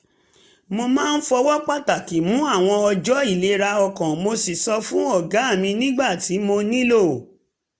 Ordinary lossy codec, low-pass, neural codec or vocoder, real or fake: none; none; none; real